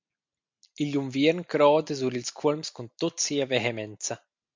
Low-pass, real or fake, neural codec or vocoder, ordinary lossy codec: 7.2 kHz; real; none; MP3, 64 kbps